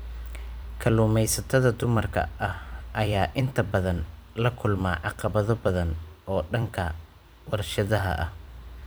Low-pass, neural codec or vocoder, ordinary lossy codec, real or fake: none; vocoder, 44.1 kHz, 128 mel bands every 256 samples, BigVGAN v2; none; fake